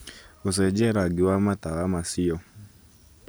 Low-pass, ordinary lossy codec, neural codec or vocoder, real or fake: none; none; none; real